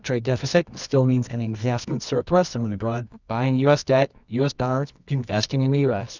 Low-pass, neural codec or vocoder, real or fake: 7.2 kHz; codec, 24 kHz, 0.9 kbps, WavTokenizer, medium music audio release; fake